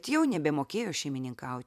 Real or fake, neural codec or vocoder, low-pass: fake; vocoder, 44.1 kHz, 128 mel bands every 256 samples, BigVGAN v2; 14.4 kHz